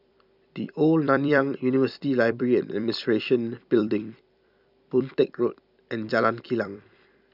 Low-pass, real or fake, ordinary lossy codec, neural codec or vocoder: 5.4 kHz; fake; none; vocoder, 44.1 kHz, 128 mel bands every 256 samples, BigVGAN v2